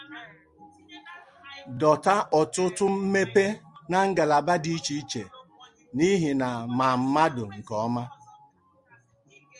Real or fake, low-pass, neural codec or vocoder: real; 10.8 kHz; none